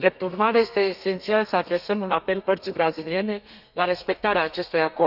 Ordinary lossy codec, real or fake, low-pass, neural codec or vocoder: none; fake; 5.4 kHz; codec, 32 kHz, 1.9 kbps, SNAC